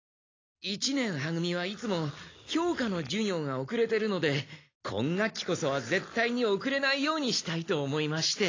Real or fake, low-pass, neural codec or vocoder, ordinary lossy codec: real; 7.2 kHz; none; AAC, 32 kbps